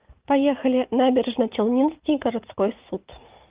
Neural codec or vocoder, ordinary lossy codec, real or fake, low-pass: vocoder, 44.1 kHz, 80 mel bands, Vocos; Opus, 32 kbps; fake; 3.6 kHz